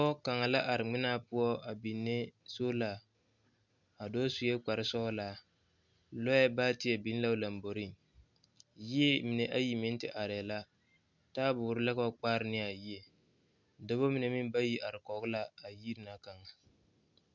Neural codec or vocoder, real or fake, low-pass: none; real; 7.2 kHz